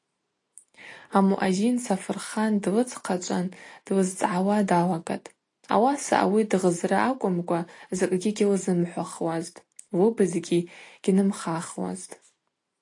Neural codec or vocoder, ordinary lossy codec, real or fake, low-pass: none; AAC, 48 kbps; real; 10.8 kHz